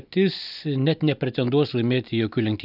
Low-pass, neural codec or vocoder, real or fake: 5.4 kHz; none; real